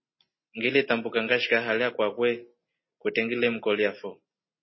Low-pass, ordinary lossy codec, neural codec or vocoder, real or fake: 7.2 kHz; MP3, 24 kbps; none; real